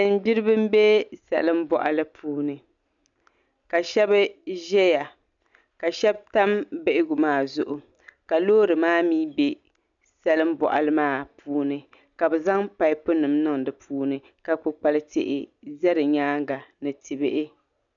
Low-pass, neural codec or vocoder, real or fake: 7.2 kHz; none; real